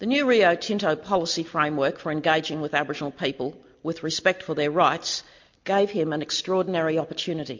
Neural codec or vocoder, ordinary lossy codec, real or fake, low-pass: none; MP3, 48 kbps; real; 7.2 kHz